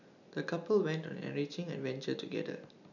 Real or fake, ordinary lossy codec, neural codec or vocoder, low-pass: real; none; none; 7.2 kHz